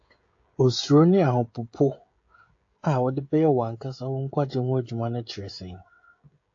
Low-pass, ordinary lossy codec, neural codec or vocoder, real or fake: 7.2 kHz; AAC, 48 kbps; codec, 16 kHz, 16 kbps, FreqCodec, smaller model; fake